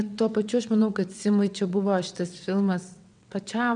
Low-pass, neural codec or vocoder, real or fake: 9.9 kHz; vocoder, 22.05 kHz, 80 mel bands, WaveNeXt; fake